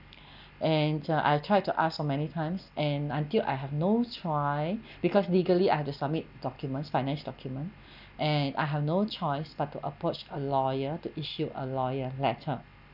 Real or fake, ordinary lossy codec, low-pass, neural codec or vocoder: real; none; 5.4 kHz; none